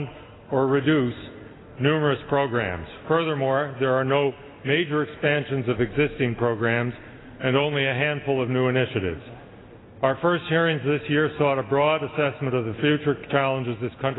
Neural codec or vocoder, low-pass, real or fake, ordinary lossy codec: none; 7.2 kHz; real; AAC, 16 kbps